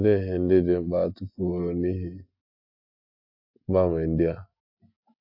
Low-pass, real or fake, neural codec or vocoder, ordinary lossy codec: 5.4 kHz; real; none; AAC, 48 kbps